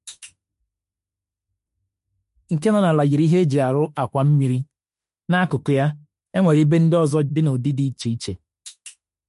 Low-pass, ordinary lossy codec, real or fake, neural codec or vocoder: 14.4 kHz; MP3, 48 kbps; fake; autoencoder, 48 kHz, 32 numbers a frame, DAC-VAE, trained on Japanese speech